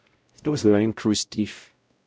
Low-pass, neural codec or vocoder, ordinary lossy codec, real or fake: none; codec, 16 kHz, 0.5 kbps, X-Codec, WavLM features, trained on Multilingual LibriSpeech; none; fake